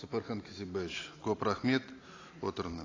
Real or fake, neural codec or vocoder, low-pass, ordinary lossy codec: real; none; 7.2 kHz; AAC, 32 kbps